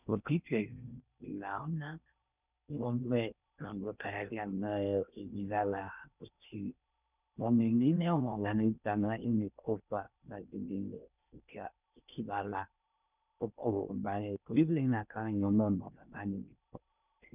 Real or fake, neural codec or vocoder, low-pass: fake; codec, 16 kHz in and 24 kHz out, 0.6 kbps, FocalCodec, streaming, 4096 codes; 3.6 kHz